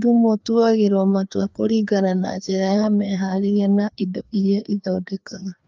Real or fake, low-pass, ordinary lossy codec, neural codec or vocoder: fake; 7.2 kHz; Opus, 32 kbps; codec, 16 kHz, 2 kbps, FreqCodec, larger model